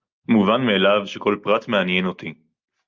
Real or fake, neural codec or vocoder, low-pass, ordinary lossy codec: real; none; 7.2 kHz; Opus, 24 kbps